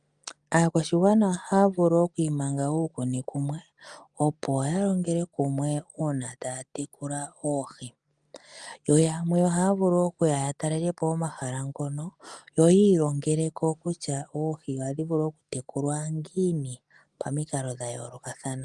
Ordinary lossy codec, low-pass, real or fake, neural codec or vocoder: Opus, 32 kbps; 10.8 kHz; real; none